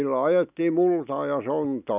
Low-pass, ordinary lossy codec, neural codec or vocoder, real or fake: 3.6 kHz; none; none; real